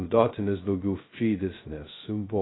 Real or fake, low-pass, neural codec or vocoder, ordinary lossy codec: fake; 7.2 kHz; codec, 16 kHz, 0.2 kbps, FocalCodec; AAC, 16 kbps